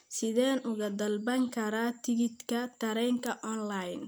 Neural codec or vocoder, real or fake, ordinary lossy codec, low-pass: none; real; none; none